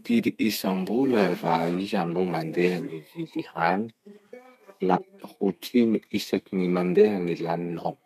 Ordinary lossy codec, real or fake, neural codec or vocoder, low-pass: none; fake; codec, 32 kHz, 1.9 kbps, SNAC; 14.4 kHz